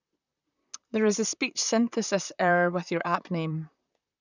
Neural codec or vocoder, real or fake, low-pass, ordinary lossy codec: vocoder, 44.1 kHz, 128 mel bands, Pupu-Vocoder; fake; 7.2 kHz; none